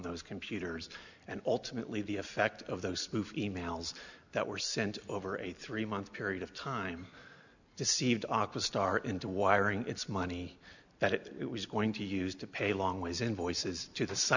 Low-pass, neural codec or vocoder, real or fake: 7.2 kHz; none; real